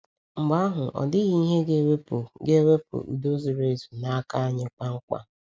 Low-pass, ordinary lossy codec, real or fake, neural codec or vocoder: none; none; real; none